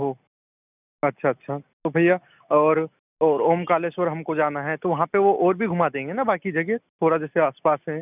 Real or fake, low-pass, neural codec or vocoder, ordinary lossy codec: real; 3.6 kHz; none; none